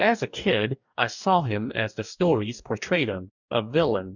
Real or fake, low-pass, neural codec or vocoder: fake; 7.2 kHz; codec, 44.1 kHz, 2.6 kbps, DAC